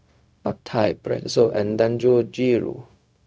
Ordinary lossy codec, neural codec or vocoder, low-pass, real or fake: none; codec, 16 kHz, 0.4 kbps, LongCat-Audio-Codec; none; fake